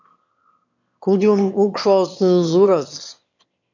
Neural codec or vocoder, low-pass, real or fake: autoencoder, 22.05 kHz, a latent of 192 numbers a frame, VITS, trained on one speaker; 7.2 kHz; fake